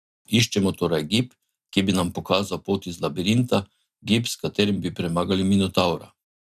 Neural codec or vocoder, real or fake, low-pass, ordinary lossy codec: none; real; 14.4 kHz; none